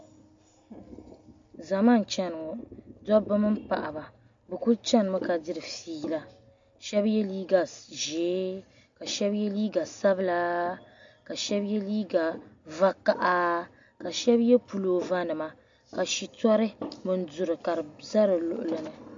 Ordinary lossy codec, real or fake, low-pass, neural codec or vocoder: MP3, 48 kbps; real; 7.2 kHz; none